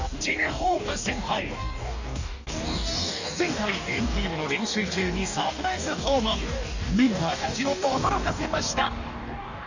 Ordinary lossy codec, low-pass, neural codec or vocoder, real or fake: none; 7.2 kHz; codec, 44.1 kHz, 2.6 kbps, DAC; fake